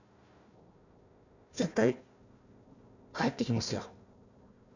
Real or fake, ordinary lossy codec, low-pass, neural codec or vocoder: fake; none; 7.2 kHz; codec, 16 kHz, 1 kbps, FunCodec, trained on Chinese and English, 50 frames a second